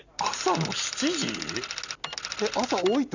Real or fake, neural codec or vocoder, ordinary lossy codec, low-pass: real; none; none; 7.2 kHz